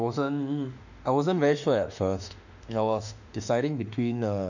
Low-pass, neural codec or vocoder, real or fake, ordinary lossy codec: 7.2 kHz; autoencoder, 48 kHz, 32 numbers a frame, DAC-VAE, trained on Japanese speech; fake; none